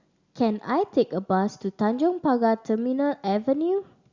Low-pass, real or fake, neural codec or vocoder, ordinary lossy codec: 7.2 kHz; real; none; Opus, 64 kbps